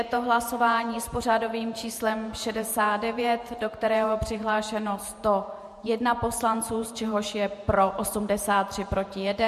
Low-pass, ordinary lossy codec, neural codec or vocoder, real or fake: 14.4 kHz; MP3, 64 kbps; vocoder, 48 kHz, 128 mel bands, Vocos; fake